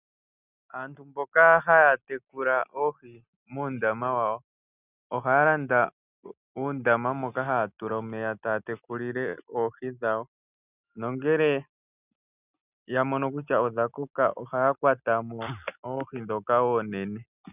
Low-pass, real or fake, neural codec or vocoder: 3.6 kHz; real; none